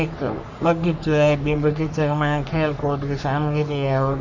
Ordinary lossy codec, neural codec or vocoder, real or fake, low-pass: none; codec, 44.1 kHz, 3.4 kbps, Pupu-Codec; fake; 7.2 kHz